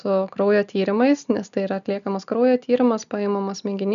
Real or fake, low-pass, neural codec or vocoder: real; 7.2 kHz; none